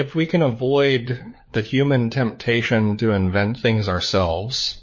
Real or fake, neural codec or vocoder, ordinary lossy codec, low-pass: fake; codec, 16 kHz, 4 kbps, FunCodec, trained on LibriTTS, 50 frames a second; MP3, 32 kbps; 7.2 kHz